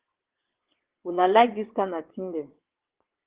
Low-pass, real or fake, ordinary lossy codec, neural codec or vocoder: 3.6 kHz; fake; Opus, 16 kbps; vocoder, 22.05 kHz, 80 mel bands, WaveNeXt